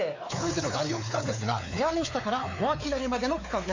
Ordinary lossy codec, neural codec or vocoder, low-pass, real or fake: AAC, 48 kbps; codec, 16 kHz, 4 kbps, X-Codec, WavLM features, trained on Multilingual LibriSpeech; 7.2 kHz; fake